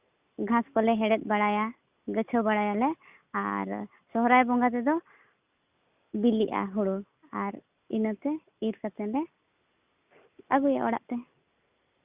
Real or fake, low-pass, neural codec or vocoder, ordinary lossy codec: real; 3.6 kHz; none; Opus, 64 kbps